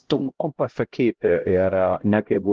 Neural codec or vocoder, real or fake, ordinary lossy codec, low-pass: codec, 16 kHz, 0.5 kbps, X-Codec, HuBERT features, trained on LibriSpeech; fake; Opus, 16 kbps; 7.2 kHz